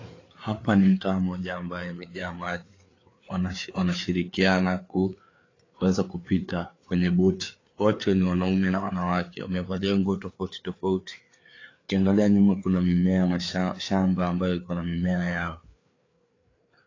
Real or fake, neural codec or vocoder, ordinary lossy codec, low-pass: fake; codec, 16 kHz, 4 kbps, FreqCodec, larger model; AAC, 32 kbps; 7.2 kHz